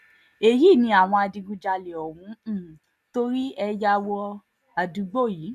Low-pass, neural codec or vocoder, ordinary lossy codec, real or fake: 14.4 kHz; none; none; real